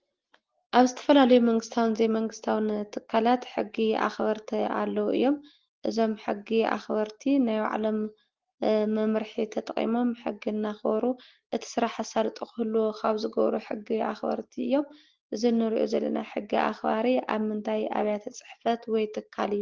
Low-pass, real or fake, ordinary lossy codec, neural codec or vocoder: 7.2 kHz; real; Opus, 16 kbps; none